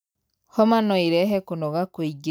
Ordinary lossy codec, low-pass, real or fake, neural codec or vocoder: none; none; real; none